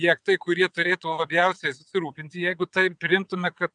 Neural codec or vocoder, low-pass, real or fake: vocoder, 22.05 kHz, 80 mel bands, WaveNeXt; 9.9 kHz; fake